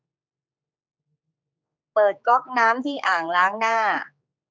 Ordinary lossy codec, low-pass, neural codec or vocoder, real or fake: none; none; codec, 16 kHz, 4 kbps, X-Codec, HuBERT features, trained on general audio; fake